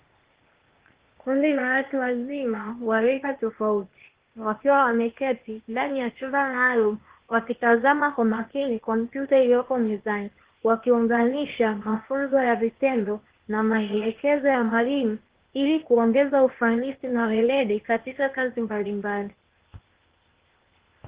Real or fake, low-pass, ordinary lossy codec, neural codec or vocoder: fake; 3.6 kHz; Opus, 16 kbps; codec, 16 kHz, 0.8 kbps, ZipCodec